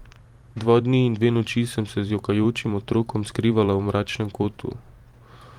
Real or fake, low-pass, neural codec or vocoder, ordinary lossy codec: fake; 19.8 kHz; vocoder, 44.1 kHz, 128 mel bands every 512 samples, BigVGAN v2; Opus, 24 kbps